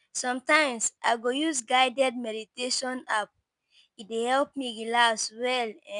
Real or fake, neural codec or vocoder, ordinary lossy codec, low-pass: real; none; none; 10.8 kHz